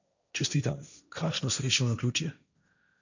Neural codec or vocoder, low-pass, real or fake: codec, 16 kHz, 1.1 kbps, Voila-Tokenizer; 7.2 kHz; fake